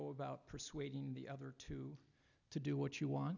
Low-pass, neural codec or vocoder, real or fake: 7.2 kHz; none; real